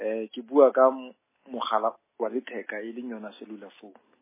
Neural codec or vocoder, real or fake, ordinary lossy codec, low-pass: none; real; MP3, 16 kbps; 3.6 kHz